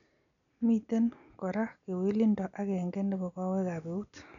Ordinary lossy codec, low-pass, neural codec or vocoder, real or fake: none; 7.2 kHz; none; real